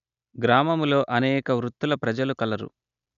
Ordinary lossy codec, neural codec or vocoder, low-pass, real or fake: none; none; 7.2 kHz; real